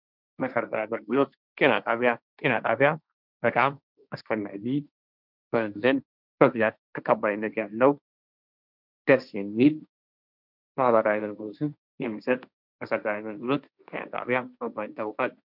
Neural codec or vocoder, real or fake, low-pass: codec, 16 kHz, 1.1 kbps, Voila-Tokenizer; fake; 5.4 kHz